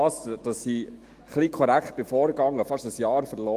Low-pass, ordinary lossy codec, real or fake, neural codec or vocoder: 14.4 kHz; Opus, 24 kbps; fake; autoencoder, 48 kHz, 128 numbers a frame, DAC-VAE, trained on Japanese speech